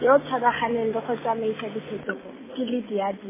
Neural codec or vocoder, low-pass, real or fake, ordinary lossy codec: none; 3.6 kHz; real; MP3, 16 kbps